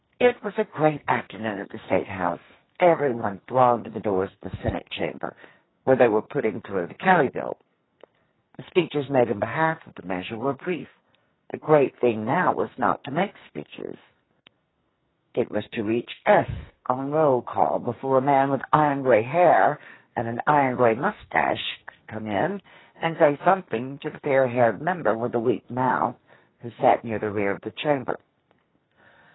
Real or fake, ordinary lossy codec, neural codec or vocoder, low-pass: fake; AAC, 16 kbps; codec, 44.1 kHz, 2.6 kbps, SNAC; 7.2 kHz